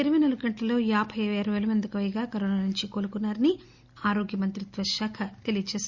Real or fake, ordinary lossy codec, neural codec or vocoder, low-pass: real; Opus, 64 kbps; none; 7.2 kHz